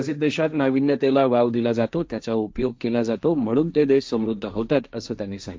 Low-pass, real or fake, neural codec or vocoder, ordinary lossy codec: none; fake; codec, 16 kHz, 1.1 kbps, Voila-Tokenizer; none